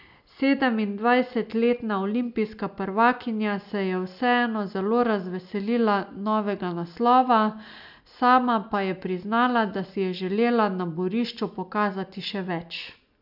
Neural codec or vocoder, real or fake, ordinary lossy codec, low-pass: none; real; none; 5.4 kHz